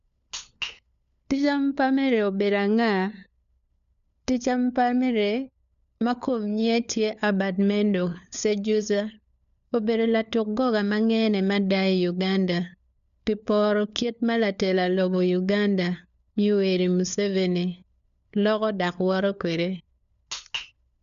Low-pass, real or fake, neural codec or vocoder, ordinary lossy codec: 7.2 kHz; fake; codec, 16 kHz, 4 kbps, FunCodec, trained on LibriTTS, 50 frames a second; none